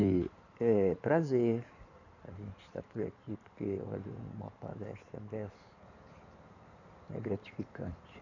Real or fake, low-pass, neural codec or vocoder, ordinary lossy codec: fake; 7.2 kHz; codec, 16 kHz in and 24 kHz out, 2.2 kbps, FireRedTTS-2 codec; none